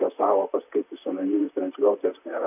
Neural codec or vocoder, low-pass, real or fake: vocoder, 44.1 kHz, 128 mel bands, Pupu-Vocoder; 3.6 kHz; fake